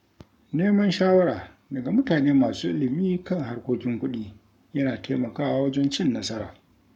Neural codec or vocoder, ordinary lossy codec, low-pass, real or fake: codec, 44.1 kHz, 7.8 kbps, Pupu-Codec; none; 19.8 kHz; fake